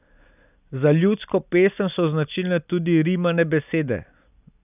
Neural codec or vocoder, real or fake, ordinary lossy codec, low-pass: none; real; none; 3.6 kHz